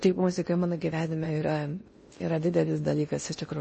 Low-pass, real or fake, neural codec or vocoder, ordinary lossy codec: 10.8 kHz; fake; codec, 16 kHz in and 24 kHz out, 0.6 kbps, FocalCodec, streaming, 2048 codes; MP3, 32 kbps